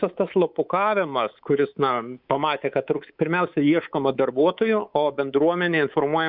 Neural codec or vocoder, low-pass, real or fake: codec, 24 kHz, 3.1 kbps, DualCodec; 5.4 kHz; fake